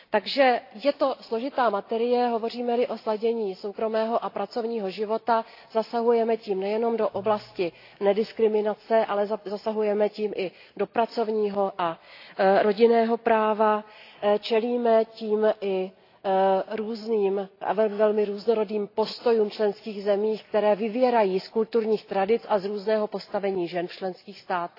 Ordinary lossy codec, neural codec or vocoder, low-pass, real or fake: AAC, 32 kbps; none; 5.4 kHz; real